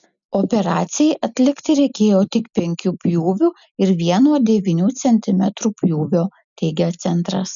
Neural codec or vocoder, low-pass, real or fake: none; 7.2 kHz; real